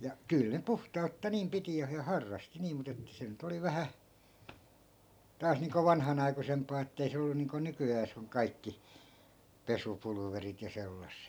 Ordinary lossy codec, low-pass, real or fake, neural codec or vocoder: none; none; real; none